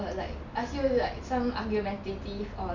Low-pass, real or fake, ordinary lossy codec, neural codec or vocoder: 7.2 kHz; real; none; none